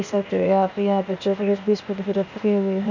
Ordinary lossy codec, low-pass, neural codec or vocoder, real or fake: none; 7.2 kHz; codec, 16 kHz, 0.8 kbps, ZipCodec; fake